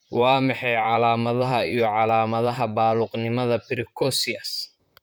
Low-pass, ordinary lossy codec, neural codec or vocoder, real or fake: none; none; vocoder, 44.1 kHz, 128 mel bands, Pupu-Vocoder; fake